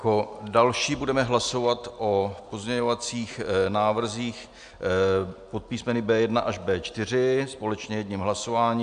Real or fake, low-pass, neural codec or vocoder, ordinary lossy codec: real; 9.9 kHz; none; AAC, 96 kbps